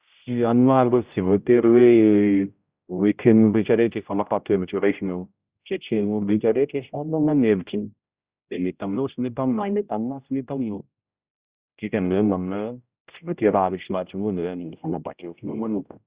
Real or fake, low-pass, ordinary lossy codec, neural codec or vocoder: fake; 3.6 kHz; Opus, 64 kbps; codec, 16 kHz, 0.5 kbps, X-Codec, HuBERT features, trained on general audio